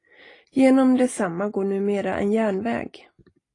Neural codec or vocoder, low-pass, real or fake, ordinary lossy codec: none; 10.8 kHz; real; AAC, 32 kbps